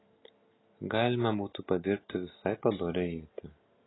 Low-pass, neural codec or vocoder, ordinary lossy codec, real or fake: 7.2 kHz; none; AAC, 16 kbps; real